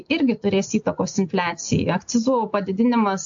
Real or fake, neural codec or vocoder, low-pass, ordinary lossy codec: real; none; 7.2 kHz; AAC, 64 kbps